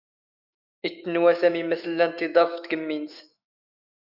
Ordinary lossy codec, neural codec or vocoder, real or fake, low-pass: Opus, 64 kbps; autoencoder, 48 kHz, 128 numbers a frame, DAC-VAE, trained on Japanese speech; fake; 5.4 kHz